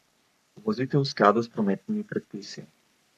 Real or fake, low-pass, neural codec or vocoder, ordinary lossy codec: fake; 14.4 kHz; codec, 44.1 kHz, 3.4 kbps, Pupu-Codec; none